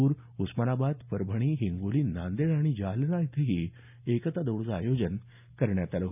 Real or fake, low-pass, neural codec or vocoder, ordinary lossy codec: real; 3.6 kHz; none; none